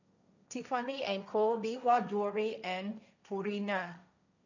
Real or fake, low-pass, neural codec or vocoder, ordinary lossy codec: fake; 7.2 kHz; codec, 16 kHz, 1.1 kbps, Voila-Tokenizer; none